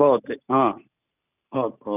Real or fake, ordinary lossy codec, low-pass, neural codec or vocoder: real; none; 3.6 kHz; none